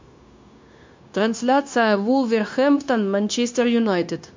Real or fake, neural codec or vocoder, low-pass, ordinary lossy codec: fake; codec, 16 kHz, 0.9 kbps, LongCat-Audio-Codec; 7.2 kHz; MP3, 48 kbps